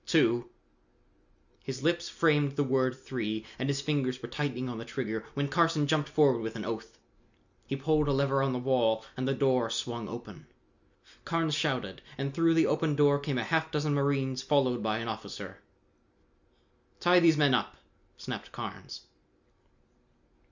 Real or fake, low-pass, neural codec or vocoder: real; 7.2 kHz; none